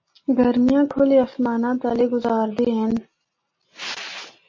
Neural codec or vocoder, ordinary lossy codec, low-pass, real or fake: none; MP3, 32 kbps; 7.2 kHz; real